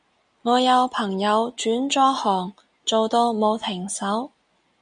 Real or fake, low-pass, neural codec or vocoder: real; 9.9 kHz; none